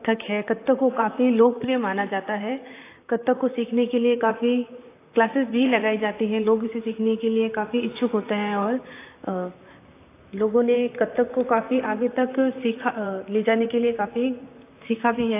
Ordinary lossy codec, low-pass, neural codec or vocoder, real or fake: AAC, 24 kbps; 3.6 kHz; vocoder, 44.1 kHz, 128 mel bands, Pupu-Vocoder; fake